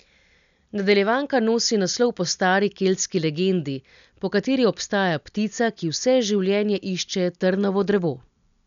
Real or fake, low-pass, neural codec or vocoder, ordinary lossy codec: real; 7.2 kHz; none; none